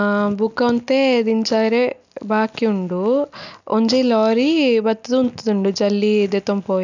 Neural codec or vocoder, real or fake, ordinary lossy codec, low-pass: none; real; none; 7.2 kHz